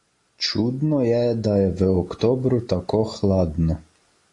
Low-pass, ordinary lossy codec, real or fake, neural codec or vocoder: 10.8 kHz; AAC, 48 kbps; real; none